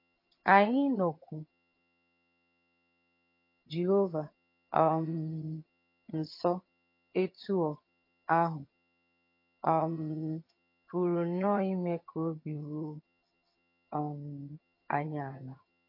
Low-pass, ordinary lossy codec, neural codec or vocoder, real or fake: 5.4 kHz; MP3, 32 kbps; vocoder, 22.05 kHz, 80 mel bands, HiFi-GAN; fake